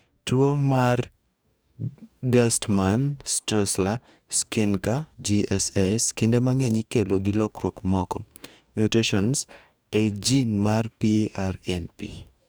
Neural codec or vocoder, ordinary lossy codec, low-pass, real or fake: codec, 44.1 kHz, 2.6 kbps, DAC; none; none; fake